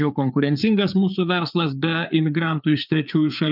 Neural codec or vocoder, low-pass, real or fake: codec, 16 kHz, 4 kbps, FreqCodec, larger model; 5.4 kHz; fake